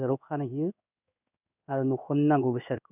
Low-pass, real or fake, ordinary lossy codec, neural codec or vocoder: 3.6 kHz; fake; none; codec, 16 kHz in and 24 kHz out, 1 kbps, XY-Tokenizer